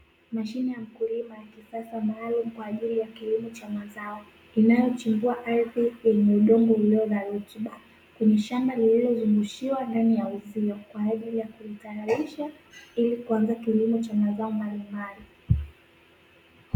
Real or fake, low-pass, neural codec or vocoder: real; 19.8 kHz; none